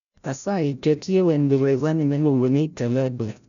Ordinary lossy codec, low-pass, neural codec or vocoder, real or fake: none; 7.2 kHz; codec, 16 kHz, 0.5 kbps, FreqCodec, larger model; fake